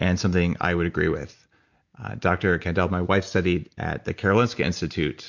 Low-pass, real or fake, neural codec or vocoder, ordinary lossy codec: 7.2 kHz; real; none; MP3, 64 kbps